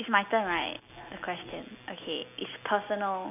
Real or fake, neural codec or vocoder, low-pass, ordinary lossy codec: real; none; 3.6 kHz; none